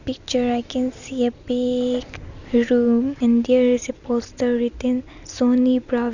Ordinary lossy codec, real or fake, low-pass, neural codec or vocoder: none; real; 7.2 kHz; none